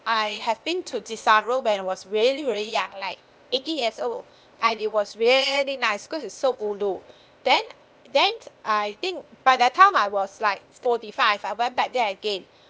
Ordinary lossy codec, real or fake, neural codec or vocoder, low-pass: none; fake; codec, 16 kHz, 0.8 kbps, ZipCodec; none